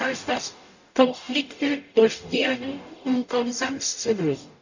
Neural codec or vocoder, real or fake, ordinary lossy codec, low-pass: codec, 44.1 kHz, 0.9 kbps, DAC; fake; none; 7.2 kHz